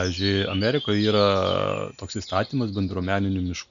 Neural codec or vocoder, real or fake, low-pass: none; real; 7.2 kHz